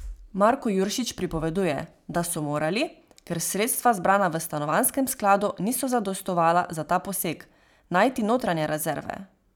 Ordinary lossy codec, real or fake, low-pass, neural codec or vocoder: none; fake; none; vocoder, 44.1 kHz, 128 mel bands every 512 samples, BigVGAN v2